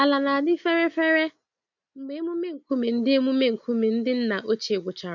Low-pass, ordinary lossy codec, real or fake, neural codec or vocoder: 7.2 kHz; none; real; none